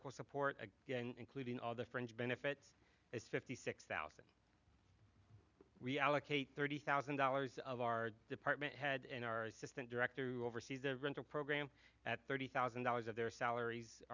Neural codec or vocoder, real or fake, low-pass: none; real; 7.2 kHz